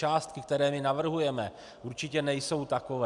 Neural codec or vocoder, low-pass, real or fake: none; 10.8 kHz; real